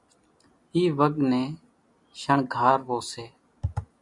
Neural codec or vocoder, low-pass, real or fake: none; 10.8 kHz; real